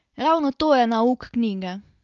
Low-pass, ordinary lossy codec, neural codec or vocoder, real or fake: 7.2 kHz; Opus, 32 kbps; none; real